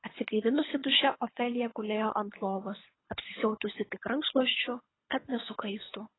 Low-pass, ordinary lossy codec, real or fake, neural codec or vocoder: 7.2 kHz; AAC, 16 kbps; fake; codec, 24 kHz, 3 kbps, HILCodec